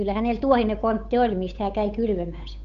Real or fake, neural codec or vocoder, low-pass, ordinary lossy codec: fake; codec, 16 kHz, 8 kbps, FunCodec, trained on Chinese and English, 25 frames a second; 7.2 kHz; none